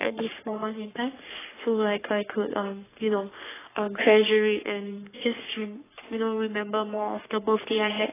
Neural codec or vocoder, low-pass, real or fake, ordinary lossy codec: codec, 44.1 kHz, 3.4 kbps, Pupu-Codec; 3.6 kHz; fake; AAC, 16 kbps